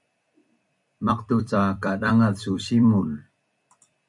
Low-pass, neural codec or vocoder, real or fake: 10.8 kHz; vocoder, 44.1 kHz, 128 mel bands every 256 samples, BigVGAN v2; fake